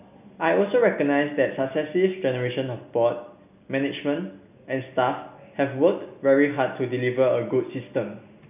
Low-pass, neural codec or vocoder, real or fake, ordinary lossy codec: 3.6 kHz; none; real; none